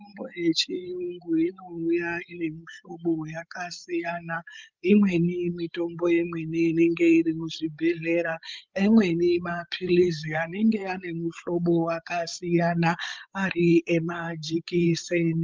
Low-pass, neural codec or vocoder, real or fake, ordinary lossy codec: 7.2 kHz; codec, 16 kHz, 16 kbps, FreqCodec, larger model; fake; Opus, 32 kbps